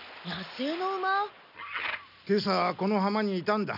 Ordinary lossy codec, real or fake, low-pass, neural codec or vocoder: none; real; 5.4 kHz; none